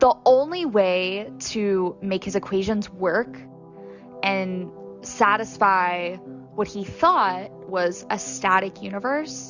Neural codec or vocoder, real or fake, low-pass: none; real; 7.2 kHz